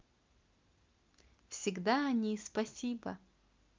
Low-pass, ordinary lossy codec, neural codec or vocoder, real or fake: 7.2 kHz; Opus, 24 kbps; none; real